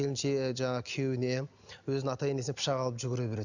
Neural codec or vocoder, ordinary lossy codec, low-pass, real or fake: none; none; 7.2 kHz; real